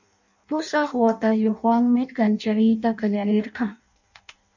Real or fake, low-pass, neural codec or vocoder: fake; 7.2 kHz; codec, 16 kHz in and 24 kHz out, 0.6 kbps, FireRedTTS-2 codec